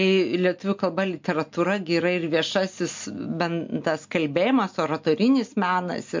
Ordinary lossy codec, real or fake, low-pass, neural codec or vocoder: MP3, 48 kbps; real; 7.2 kHz; none